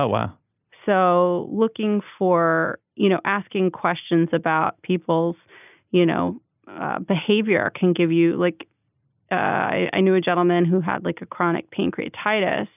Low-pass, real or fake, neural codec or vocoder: 3.6 kHz; real; none